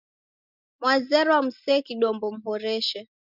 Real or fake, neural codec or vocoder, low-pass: real; none; 5.4 kHz